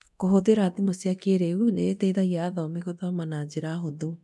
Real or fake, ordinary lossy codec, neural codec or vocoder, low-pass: fake; none; codec, 24 kHz, 0.9 kbps, DualCodec; none